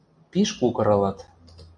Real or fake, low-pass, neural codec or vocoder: real; 9.9 kHz; none